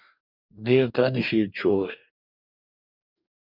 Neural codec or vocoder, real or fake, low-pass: codec, 44.1 kHz, 2.6 kbps, DAC; fake; 5.4 kHz